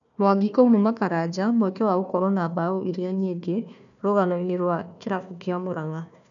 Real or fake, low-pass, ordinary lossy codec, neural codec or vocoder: fake; 7.2 kHz; none; codec, 16 kHz, 1 kbps, FunCodec, trained on Chinese and English, 50 frames a second